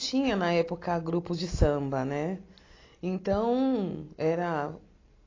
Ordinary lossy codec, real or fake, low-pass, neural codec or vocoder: AAC, 32 kbps; real; 7.2 kHz; none